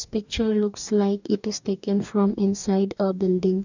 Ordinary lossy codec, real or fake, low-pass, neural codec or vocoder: none; fake; 7.2 kHz; codec, 44.1 kHz, 2.6 kbps, DAC